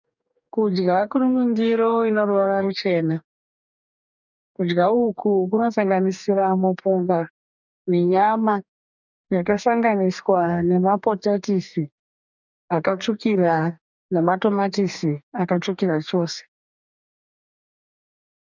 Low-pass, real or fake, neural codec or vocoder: 7.2 kHz; fake; codec, 44.1 kHz, 2.6 kbps, DAC